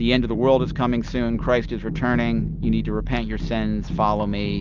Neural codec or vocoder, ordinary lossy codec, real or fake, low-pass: none; Opus, 24 kbps; real; 7.2 kHz